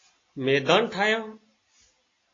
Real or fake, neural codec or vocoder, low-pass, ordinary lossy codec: real; none; 7.2 kHz; AAC, 32 kbps